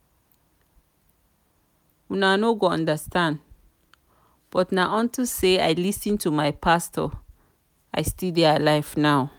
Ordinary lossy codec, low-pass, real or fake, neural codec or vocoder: none; none; real; none